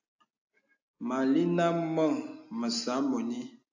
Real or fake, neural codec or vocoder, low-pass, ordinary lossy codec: real; none; 7.2 kHz; AAC, 48 kbps